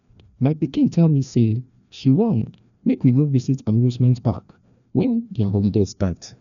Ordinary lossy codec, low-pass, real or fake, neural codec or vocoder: none; 7.2 kHz; fake; codec, 16 kHz, 1 kbps, FreqCodec, larger model